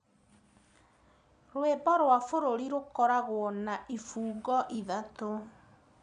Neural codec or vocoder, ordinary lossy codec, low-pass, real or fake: none; none; 9.9 kHz; real